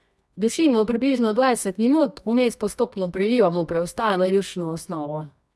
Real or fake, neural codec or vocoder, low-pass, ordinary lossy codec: fake; codec, 24 kHz, 0.9 kbps, WavTokenizer, medium music audio release; none; none